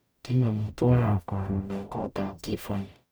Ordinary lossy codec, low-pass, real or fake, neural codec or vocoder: none; none; fake; codec, 44.1 kHz, 0.9 kbps, DAC